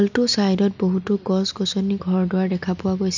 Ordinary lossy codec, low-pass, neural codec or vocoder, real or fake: none; 7.2 kHz; none; real